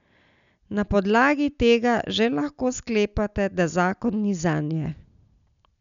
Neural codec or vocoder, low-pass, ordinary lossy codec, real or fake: none; 7.2 kHz; none; real